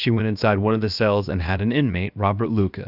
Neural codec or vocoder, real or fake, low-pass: codec, 16 kHz, about 1 kbps, DyCAST, with the encoder's durations; fake; 5.4 kHz